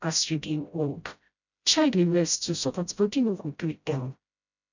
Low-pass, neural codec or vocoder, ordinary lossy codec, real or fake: 7.2 kHz; codec, 16 kHz, 0.5 kbps, FreqCodec, smaller model; none; fake